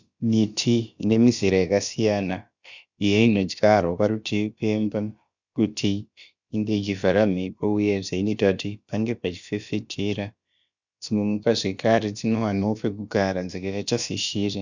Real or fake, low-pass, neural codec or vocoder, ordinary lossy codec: fake; 7.2 kHz; codec, 16 kHz, about 1 kbps, DyCAST, with the encoder's durations; Opus, 64 kbps